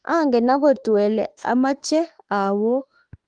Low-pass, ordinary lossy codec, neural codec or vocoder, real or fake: 9.9 kHz; Opus, 24 kbps; autoencoder, 48 kHz, 32 numbers a frame, DAC-VAE, trained on Japanese speech; fake